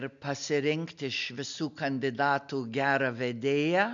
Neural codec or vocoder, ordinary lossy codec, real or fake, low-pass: none; MP3, 64 kbps; real; 7.2 kHz